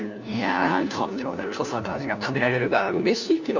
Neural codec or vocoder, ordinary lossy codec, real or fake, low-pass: codec, 16 kHz, 1 kbps, FunCodec, trained on LibriTTS, 50 frames a second; none; fake; 7.2 kHz